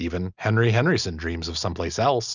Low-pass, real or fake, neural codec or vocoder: 7.2 kHz; real; none